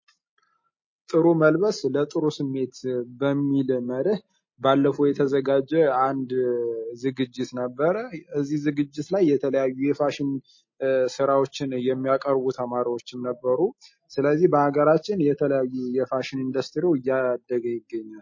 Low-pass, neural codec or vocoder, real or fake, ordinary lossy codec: 7.2 kHz; none; real; MP3, 32 kbps